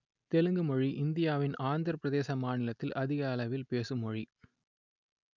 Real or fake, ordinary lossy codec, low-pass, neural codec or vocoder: real; none; 7.2 kHz; none